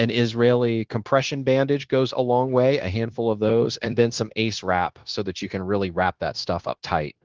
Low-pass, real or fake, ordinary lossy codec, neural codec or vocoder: 7.2 kHz; fake; Opus, 16 kbps; codec, 16 kHz, 0.9 kbps, LongCat-Audio-Codec